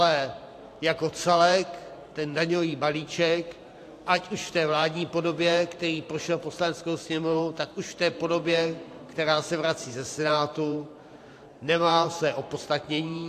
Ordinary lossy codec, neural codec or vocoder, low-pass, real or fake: AAC, 64 kbps; vocoder, 44.1 kHz, 128 mel bands every 512 samples, BigVGAN v2; 14.4 kHz; fake